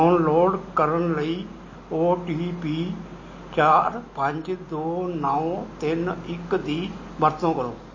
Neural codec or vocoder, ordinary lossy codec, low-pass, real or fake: none; MP3, 32 kbps; 7.2 kHz; real